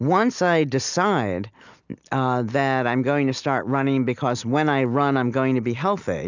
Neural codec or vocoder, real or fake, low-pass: none; real; 7.2 kHz